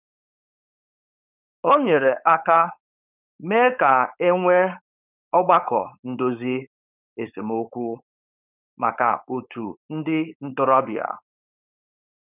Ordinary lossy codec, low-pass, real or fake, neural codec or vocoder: none; 3.6 kHz; fake; codec, 16 kHz, 4.8 kbps, FACodec